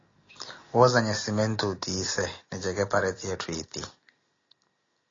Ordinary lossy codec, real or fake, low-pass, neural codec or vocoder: AAC, 32 kbps; real; 7.2 kHz; none